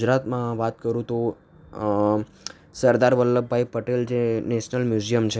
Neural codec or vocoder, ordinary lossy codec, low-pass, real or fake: none; none; none; real